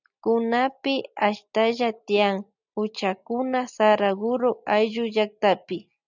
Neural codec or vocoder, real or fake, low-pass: none; real; 7.2 kHz